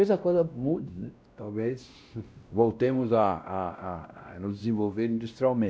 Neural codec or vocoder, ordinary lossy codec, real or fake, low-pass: codec, 16 kHz, 1 kbps, X-Codec, WavLM features, trained on Multilingual LibriSpeech; none; fake; none